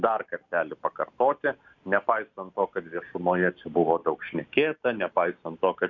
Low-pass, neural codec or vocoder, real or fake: 7.2 kHz; none; real